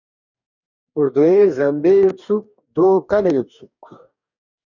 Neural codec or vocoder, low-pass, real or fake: codec, 44.1 kHz, 2.6 kbps, DAC; 7.2 kHz; fake